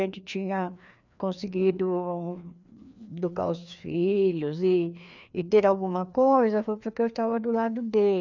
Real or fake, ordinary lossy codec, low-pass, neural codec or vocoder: fake; none; 7.2 kHz; codec, 16 kHz, 2 kbps, FreqCodec, larger model